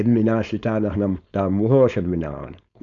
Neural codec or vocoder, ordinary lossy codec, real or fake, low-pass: codec, 16 kHz, 4.8 kbps, FACodec; none; fake; 7.2 kHz